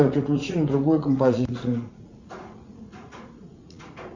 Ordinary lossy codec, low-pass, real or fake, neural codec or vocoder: Opus, 64 kbps; 7.2 kHz; fake; vocoder, 44.1 kHz, 80 mel bands, Vocos